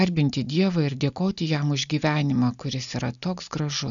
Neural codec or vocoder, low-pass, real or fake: none; 7.2 kHz; real